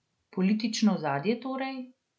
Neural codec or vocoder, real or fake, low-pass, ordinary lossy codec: none; real; none; none